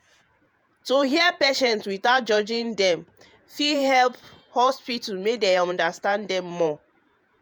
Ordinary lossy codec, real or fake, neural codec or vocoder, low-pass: none; fake; vocoder, 48 kHz, 128 mel bands, Vocos; none